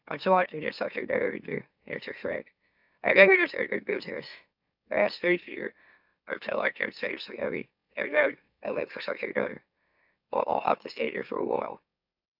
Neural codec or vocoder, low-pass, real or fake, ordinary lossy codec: autoencoder, 44.1 kHz, a latent of 192 numbers a frame, MeloTTS; 5.4 kHz; fake; AAC, 48 kbps